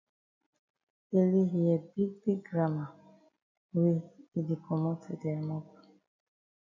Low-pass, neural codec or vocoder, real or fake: 7.2 kHz; none; real